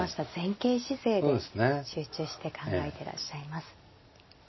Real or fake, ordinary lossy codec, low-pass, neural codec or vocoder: real; MP3, 24 kbps; 7.2 kHz; none